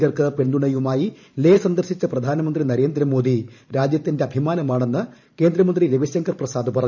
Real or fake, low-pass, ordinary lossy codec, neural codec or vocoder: fake; 7.2 kHz; none; vocoder, 44.1 kHz, 128 mel bands every 512 samples, BigVGAN v2